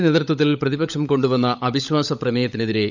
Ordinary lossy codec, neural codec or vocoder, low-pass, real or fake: none; codec, 16 kHz, 16 kbps, FunCodec, trained on LibriTTS, 50 frames a second; 7.2 kHz; fake